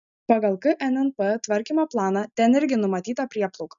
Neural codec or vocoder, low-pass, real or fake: none; 7.2 kHz; real